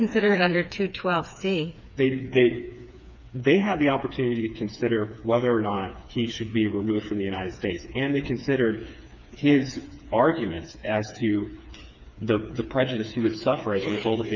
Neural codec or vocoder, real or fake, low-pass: codec, 16 kHz, 4 kbps, FreqCodec, smaller model; fake; 7.2 kHz